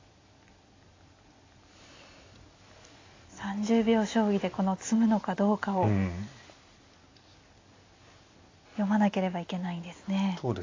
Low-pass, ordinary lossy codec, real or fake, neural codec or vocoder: 7.2 kHz; AAC, 32 kbps; real; none